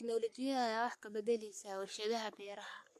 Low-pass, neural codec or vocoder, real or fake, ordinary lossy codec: 14.4 kHz; codec, 44.1 kHz, 3.4 kbps, Pupu-Codec; fake; MP3, 64 kbps